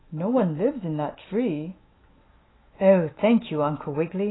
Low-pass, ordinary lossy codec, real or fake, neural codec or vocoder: 7.2 kHz; AAC, 16 kbps; fake; autoencoder, 48 kHz, 128 numbers a frame, DAC-VAE, trained on Japanese speech